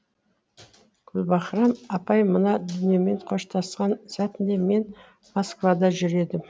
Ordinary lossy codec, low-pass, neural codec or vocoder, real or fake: none; none; none; real